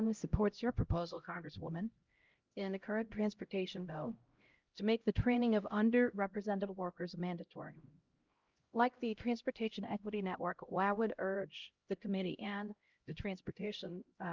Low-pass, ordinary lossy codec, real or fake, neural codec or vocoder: 7.2 kHz; Opus, 32 kbps; fake; codec, 16 kHz, 0.5 kbps, X-Codec, HuBERT features, trained on LibriSpeech